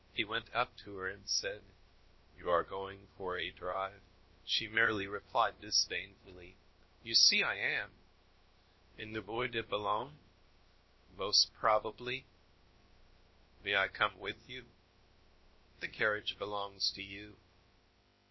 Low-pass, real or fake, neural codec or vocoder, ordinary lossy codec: 7.2 kHz; fake; codec, 16 kHz, about 1 kbps, DyCAST, with the encoder's durations; MP3, 24 kbps